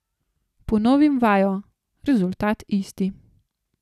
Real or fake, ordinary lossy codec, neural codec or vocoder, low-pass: real; none; none; 14.4 kHz